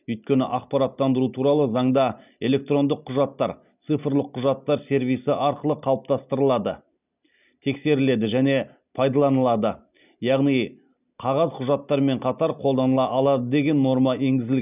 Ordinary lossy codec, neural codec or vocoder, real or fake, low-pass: none; none; real; 3.6 kHz